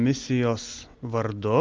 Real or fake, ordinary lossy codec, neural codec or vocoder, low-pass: real; Opus, 32 kbps; none; 7.2 kHz